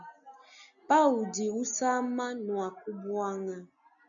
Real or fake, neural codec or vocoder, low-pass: real; none; 7.2 kHz